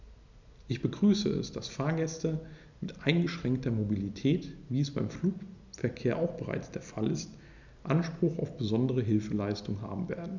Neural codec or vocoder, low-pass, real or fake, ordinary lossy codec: none; 7.2 kHz; real; none